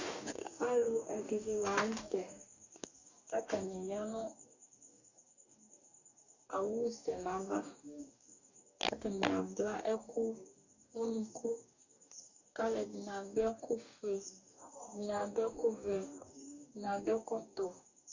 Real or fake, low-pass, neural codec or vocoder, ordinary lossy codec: fake; 7.2 kHz; codec, 44.1 kHz, 2.6 kbps, DAC; Opus, 64 kbps